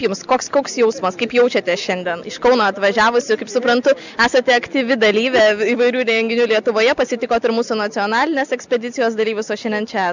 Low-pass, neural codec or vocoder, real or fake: 7.2 kHz; none; real